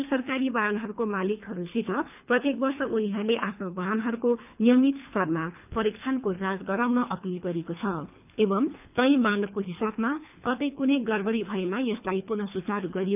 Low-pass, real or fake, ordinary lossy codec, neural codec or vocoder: 3.6 kHz; fake; none; codec, 24 kHz, 3 kbps, HILCodec